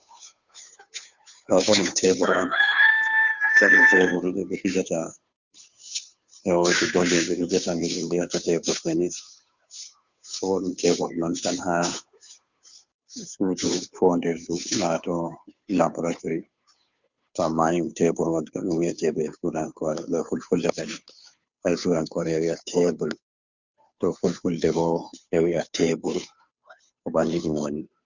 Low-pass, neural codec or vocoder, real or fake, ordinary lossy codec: 7.2 kHz; codec, 16 kHz, 2 kbps, FunCodec, trained on Chinese and English, 25 frames a second; fake; Opus, 64 kbps